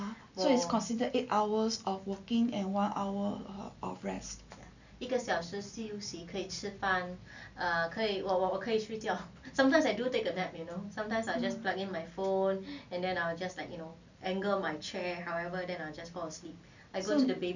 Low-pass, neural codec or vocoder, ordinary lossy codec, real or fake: 7.2 kHz; none; none; real